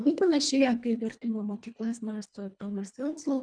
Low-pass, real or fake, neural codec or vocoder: 9.9 kHz; fake; codec, 24 kHz, 1.5 kbps, HILCodec